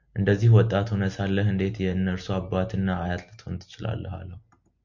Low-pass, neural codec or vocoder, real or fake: 7.2 kHz; none; real